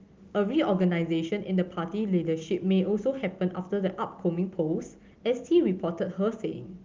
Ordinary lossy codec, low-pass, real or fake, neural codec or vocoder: Opus, 32 kbps; 7.2 kHz; real; none